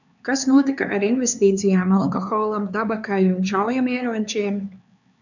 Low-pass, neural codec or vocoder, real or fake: 7.2 kHz; codec, 16 kHz, 4 kbps, X-Codec, HuBERT features, trained on LibriSpeech; fake